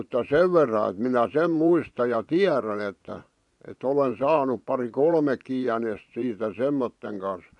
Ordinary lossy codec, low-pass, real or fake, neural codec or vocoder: none; 10.8 kHz; fake; vocoder, 24 kHz, 100 mel bands, Vocos